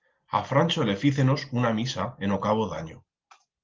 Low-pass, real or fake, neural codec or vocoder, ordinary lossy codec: 7.2 kHz; real; none; Opus, 16 kbps